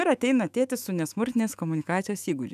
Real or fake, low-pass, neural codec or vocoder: fake; 14.4 kHz; autoencoder, 48 kHz, 128 numbers a frame, DAC-VAE, trained on Japanese speech